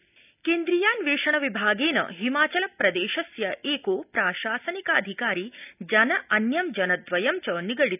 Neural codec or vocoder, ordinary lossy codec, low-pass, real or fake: none; none; 3.6 kHz; real